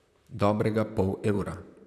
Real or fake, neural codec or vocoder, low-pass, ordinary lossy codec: fake; vocoder, 44.1 kHz, 128 mel bands, Pupu-Vocoder; 14.4 kHz; none